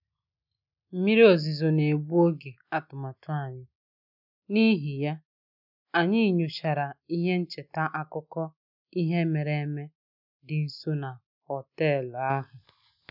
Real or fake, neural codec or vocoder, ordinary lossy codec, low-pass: real; none; none; 5.4 kHz